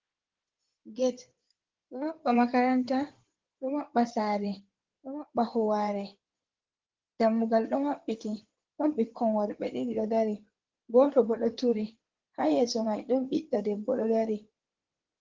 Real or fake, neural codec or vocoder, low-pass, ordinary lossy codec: fake; codec, 16 kHz in and 24 kHz out, 2.2 kbps, FireRedTTS-2 codec; 7.2 kHz; Opus, 16 kbps